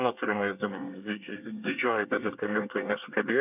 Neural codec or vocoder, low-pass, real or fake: codec, 24 kHz, 1 kbps, SNAC; 3.6 kHz; fake